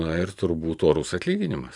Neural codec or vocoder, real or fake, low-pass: vocoder, 44.1 kHz, 128 mel bands every 512 samples, BigVGAN v2; fake; 10.8 kHz